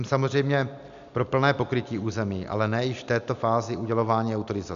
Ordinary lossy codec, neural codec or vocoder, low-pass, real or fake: MP3, 96 kbps; none; 7.2 kHz; real